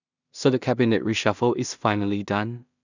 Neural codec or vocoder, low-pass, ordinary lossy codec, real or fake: codec, 16 kHz in and 24 kHz out, 0.4 kbps, LongCat-Audio-Codec, two codebook decoder; 7.2 kHz; none; fake